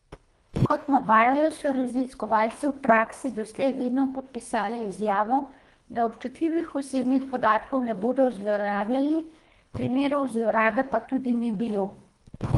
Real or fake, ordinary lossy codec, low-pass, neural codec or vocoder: fake; Opus, 32 kbps; 10.8 kHz; codec, 24 kHz, 1.5 kbps, HILCodec